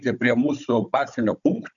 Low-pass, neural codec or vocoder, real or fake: 7.2 kHz; codec, 16 kHz, 16 kbps, FunCodec, trained on Chinese and English, 50 frames a second; fake